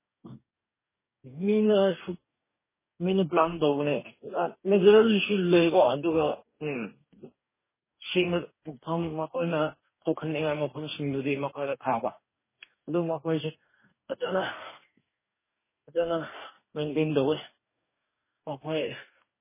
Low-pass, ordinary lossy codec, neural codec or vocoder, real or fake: 3.6 kHz; MP3, 16 kbps; codec, 44.1 kHz, 2.6 kbps, DAC; fake